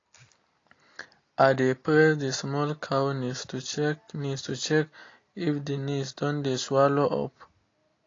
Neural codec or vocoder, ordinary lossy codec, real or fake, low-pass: none; AAC, 32 kbps; real; 7.2 kHz